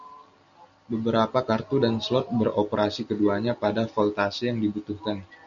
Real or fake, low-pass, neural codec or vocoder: real; 7.2 kHz; none